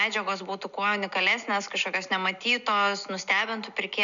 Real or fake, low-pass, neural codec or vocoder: real; 7.2 kHz; none